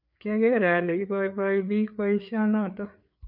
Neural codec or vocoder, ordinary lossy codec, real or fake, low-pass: codec, 16 kHz, 4 kbps, FreqCodec, larger model; MP3, 48 kbps; fake; 5.4 kHz